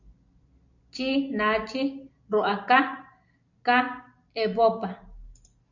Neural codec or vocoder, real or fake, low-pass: none; real; 7.2 kHz